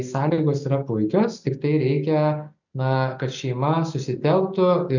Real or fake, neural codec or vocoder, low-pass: real; none; 7.2 kHz